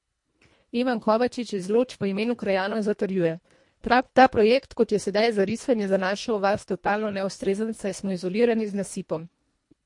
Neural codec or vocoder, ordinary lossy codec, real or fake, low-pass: codec, 24 kHz, 1.5 kbps, HILCodec; MP3, 48 kbps; fake; 10.8 kHz